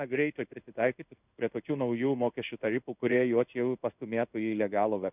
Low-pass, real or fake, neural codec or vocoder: 3.6 kHz; fake; codec, 16 kHz in and 24 kHz out, 1 kbps, XY-Tokenizer